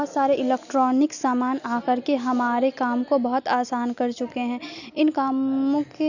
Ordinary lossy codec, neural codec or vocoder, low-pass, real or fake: none; none; 7.2 kHz; real